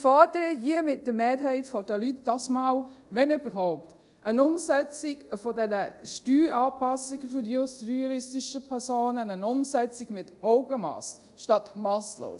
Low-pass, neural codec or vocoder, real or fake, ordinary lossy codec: 10.8 kHz; codec, 24 kHz, 0.5 kbps, DualCodec; fake; none